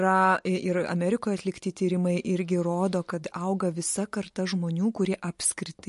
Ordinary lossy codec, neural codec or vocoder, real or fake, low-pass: MP3, 48 kbps; none; real; 14.4 kHz